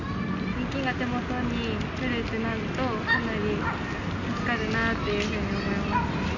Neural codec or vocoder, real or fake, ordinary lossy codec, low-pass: none; real; none; 7.2 kHz